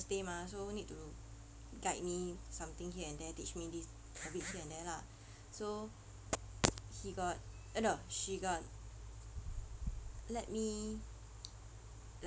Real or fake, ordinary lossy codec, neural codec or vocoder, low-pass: real; none; none; none